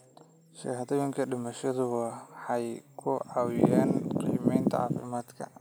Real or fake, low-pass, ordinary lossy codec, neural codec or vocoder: real; none; none; none